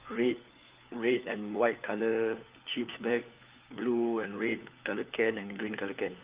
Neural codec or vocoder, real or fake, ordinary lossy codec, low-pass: codec, 16 kHz, 4 kbps, FunCodec, trained on LibriTTS, 50 frames a second; fake; Opus, 24 kbps; 3.6 kHz